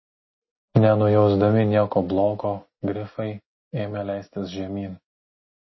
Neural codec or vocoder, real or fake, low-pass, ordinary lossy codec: none; real; 7.2 kHz; MP3, 24 kbps